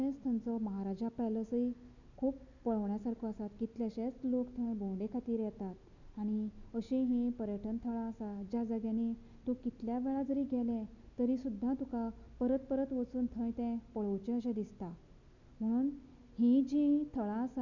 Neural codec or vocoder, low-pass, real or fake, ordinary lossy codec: none; 7.2 kHz; real; none